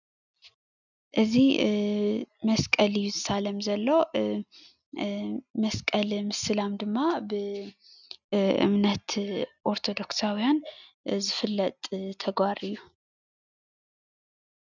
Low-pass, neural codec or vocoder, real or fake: 7.2 kHz; none; real